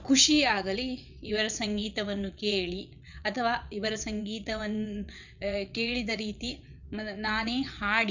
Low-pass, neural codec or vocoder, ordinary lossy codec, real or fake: 7.2 kHz; vocoder, 22.05 kHz, 80 mel bands, WaveNeXt; none; fake